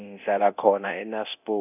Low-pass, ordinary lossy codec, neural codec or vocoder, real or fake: 3.6 kHz; none; codec, 24 kHz, 0.9 kbps, DualCodec; fake